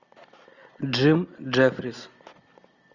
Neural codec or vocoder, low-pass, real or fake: none; 7.2 kHz; real